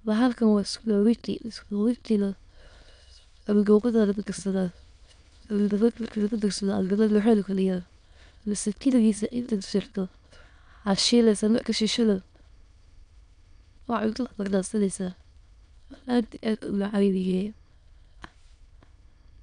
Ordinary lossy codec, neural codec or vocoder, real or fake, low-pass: none; autoencoder, 22.05 kHz, a latent of 192 numbers a frame, VITS, trained on many speakers; fake; 9.9 kHz